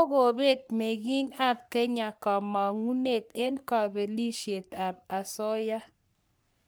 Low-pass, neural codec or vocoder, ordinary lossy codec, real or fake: none; codec, 44.1 kHz, 3.4 kbps, Pupu-Codec; none; fake